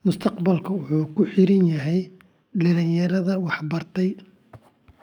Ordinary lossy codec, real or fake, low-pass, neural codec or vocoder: none; fake; 19.8 kHz; codec, 44.1 kHz, 7.8 kbps, DAC